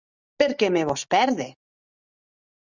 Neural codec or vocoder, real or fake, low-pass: none; real; 7.2 kHz